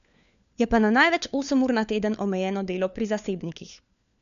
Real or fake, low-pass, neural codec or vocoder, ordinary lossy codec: fake; 7.2 kHz; codec, 16 kHz, 4 kbps, FunCodec, trained on LibriTTS, 50 frames a second; none